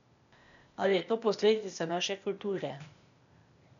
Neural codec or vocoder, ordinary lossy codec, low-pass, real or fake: codec, 16 kHz, 0.8 kbps, ZipCodec; none; 7.2 kHz; fake